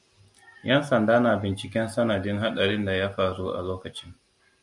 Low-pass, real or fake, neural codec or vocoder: 10.8 kHz; real; none